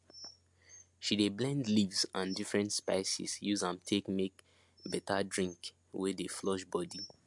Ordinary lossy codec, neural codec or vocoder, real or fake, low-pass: MP3, 64 kbps; none; real; 10.8 kHz